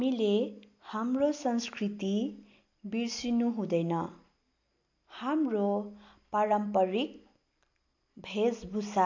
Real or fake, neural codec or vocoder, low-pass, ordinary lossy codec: real; none; 7.2 kHz; AAC, 48 kbps